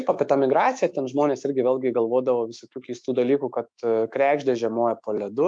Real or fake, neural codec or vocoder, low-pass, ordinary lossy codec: fake; autoencoder, 48 kHz, 128 numbers a frame, DAC-VAE, trained on Japanese speech; 9.9 kHz; MP3, 64 kbps